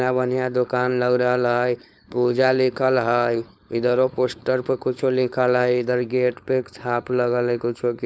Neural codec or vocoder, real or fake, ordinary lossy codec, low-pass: codec, 16 kHz, 4.8 kbps, FACodec; fake; none; none